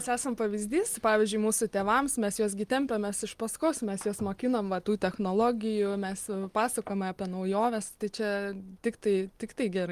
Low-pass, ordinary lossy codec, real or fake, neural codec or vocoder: 14.4 kHz; Opus, 32 kbps; real; none